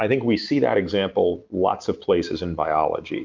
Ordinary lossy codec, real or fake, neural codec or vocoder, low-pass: Opus, 24 kbps; real; none; 7.2 kHz